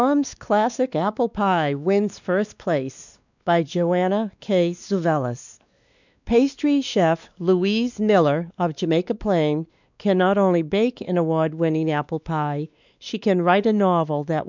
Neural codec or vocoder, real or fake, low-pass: codec, 16 kHz, 2 kbps, X-Codec, WavLM features, trained on Multilingual LibriSpeech; fake; 7.2 kHz